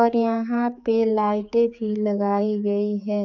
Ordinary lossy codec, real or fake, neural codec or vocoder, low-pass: none; fake; codec, 16 kHz, 4 kbps, X-Codec, HuBERT features, trained on general audio; 7.2 kHz